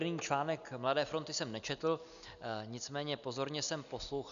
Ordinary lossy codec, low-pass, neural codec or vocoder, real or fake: MP3, 96 kbps; 7.2 kHz; none; real